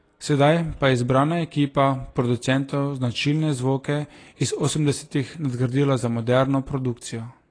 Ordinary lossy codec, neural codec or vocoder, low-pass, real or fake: AAC, 32 kbps; none; 9.9 kHz; real